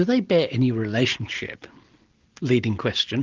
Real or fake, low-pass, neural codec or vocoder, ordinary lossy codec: real; 7.2 kHz; none; Opus, 16 kbps